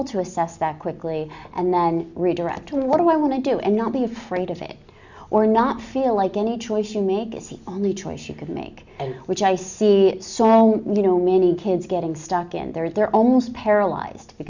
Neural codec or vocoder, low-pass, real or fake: none; 7.2 kHz; real